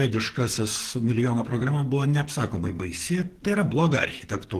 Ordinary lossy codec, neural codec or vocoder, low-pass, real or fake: Opus, 16 kbps; codec, 44.1 kHz, 2.6 kbps, SNAC; 14.4 kHz; fake